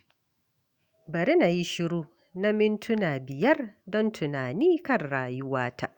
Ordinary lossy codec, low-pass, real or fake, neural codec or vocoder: Opus, 64 kbps; 19.8 kHz; fake; autoencoder, 48 kHz, 128 numbers a frame, DAC-VAE, trained on Japanese speech